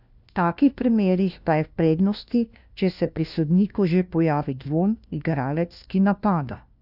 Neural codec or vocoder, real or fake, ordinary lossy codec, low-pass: codec, 16 kHz, 1 kbps, FunCodec, trained on LibriTTS, 50 frames a second; fake; none; 5.4 kHz